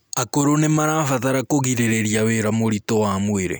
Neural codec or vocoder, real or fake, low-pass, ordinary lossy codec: none; real; none; none